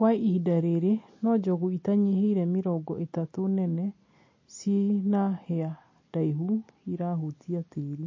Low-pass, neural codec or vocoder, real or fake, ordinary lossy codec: 7.2 kHz; none; real; MP3, 32 kbps